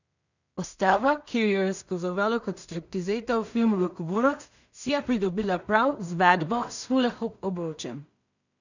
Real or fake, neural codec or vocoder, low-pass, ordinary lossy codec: fake; codec, 16 kHz in and 24 kHz out, 0.4 kbps, LongCat-Audio-Codec, two codebook decoder; 7.2 kHz; none